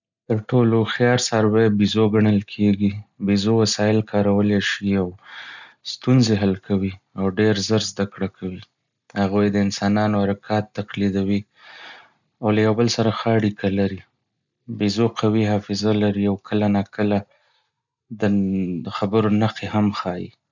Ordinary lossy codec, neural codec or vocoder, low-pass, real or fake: none; none; 7.2 kHz; real